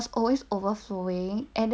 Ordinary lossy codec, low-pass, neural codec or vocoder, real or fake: none; none; none; real